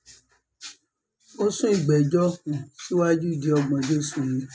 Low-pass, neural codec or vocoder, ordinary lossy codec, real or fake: none; none; none; real